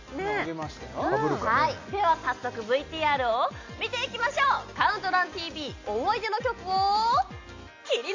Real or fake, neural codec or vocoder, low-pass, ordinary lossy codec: real; none; 7.2 kHz; AAC, 48 kbps